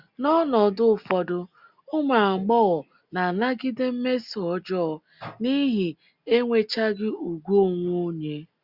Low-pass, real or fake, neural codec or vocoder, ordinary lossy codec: 5.4 kHz; real; none; Opus, 64 kbps